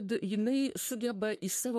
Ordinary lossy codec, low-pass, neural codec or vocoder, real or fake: MP3, 64 kbps; 14.4 kHz; codec, 44.1 kHz, 3.4 kbps, Pupu-Codec; fake